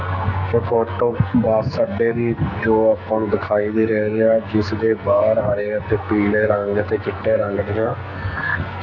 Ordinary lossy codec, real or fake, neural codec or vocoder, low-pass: none; fake; codec, 44.1 kHz, 2.6 kbps, SNAC; 7.2 kHz